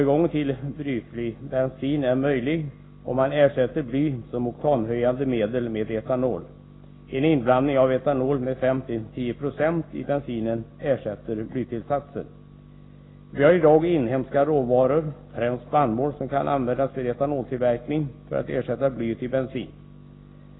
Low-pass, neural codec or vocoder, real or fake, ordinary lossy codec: 7.2 kHz; none; real; AAC, 16 kbps